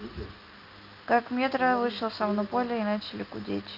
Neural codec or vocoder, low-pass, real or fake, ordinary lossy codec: none; 5.4 kHz; real; Opus, 24 kbps